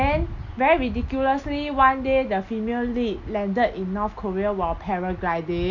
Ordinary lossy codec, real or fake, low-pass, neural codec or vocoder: none; real; 7.2 kHz; none